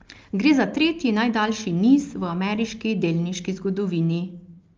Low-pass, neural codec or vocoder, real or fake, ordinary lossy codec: 7.2 kHz; none; real; Opus, 24 kbps